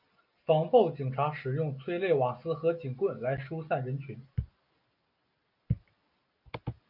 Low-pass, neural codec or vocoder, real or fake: 5.4 kHz; none; real